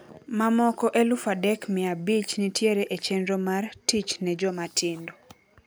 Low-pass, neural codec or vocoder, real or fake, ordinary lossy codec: none; none; real; none